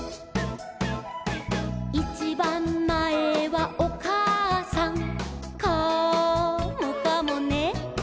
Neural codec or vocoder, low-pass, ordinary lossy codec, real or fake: none; none; none; real